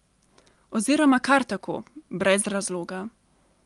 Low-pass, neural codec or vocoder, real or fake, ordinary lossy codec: 10.8 kHz; none; real; Opus, 32 kbps